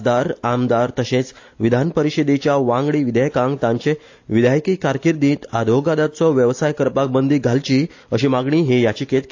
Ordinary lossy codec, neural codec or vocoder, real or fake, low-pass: AAC, 48 kbps; none; real; 7.2 kHz